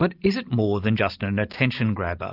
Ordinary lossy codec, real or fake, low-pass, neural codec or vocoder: Opus, 24 kbps; real; 5.4 kHz; none